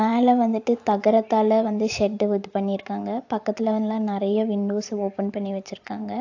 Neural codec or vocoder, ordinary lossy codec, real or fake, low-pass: vocoder, 44.1 kHz, 128 mel bands every 512 samples, BigVGAN v2; AAC, 48 kbps; fake; 7.2 kHz